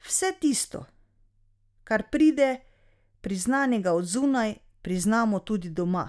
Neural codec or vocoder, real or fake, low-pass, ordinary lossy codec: none; real; none; none